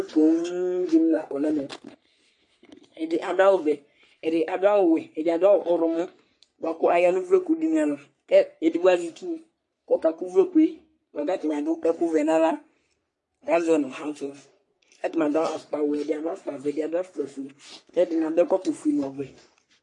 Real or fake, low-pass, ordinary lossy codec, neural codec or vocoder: fake; 10.8 kHz; MP3, 48 kbps; codec, 44.1 kHz, 3.4 kbps, Pupu-Codec